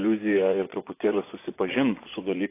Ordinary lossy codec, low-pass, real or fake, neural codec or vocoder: AAC, 24 kbps; 3.6 kHz; fake; vocoder, 24 kHz, 100 mel bands, Vocos